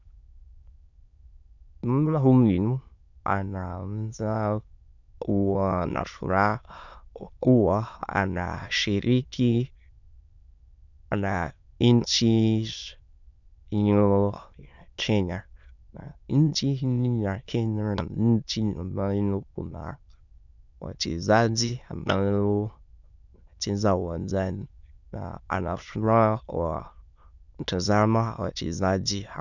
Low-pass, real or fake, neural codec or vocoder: 7.2 kHz; fake; autoencoder, 22.05 kHz, a latent of 192 numbers a frame, VITS, trained on many speakers